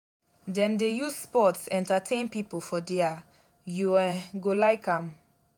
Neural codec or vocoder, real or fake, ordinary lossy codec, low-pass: vocoder, 48 kHz, 128 mel bands, Vocos; fake; none; none